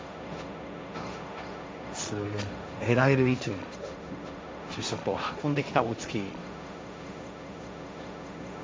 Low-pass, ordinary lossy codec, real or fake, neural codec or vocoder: none; none; fake; codec, 16 kHz, 1.1 kbps, Voila-Tokenizer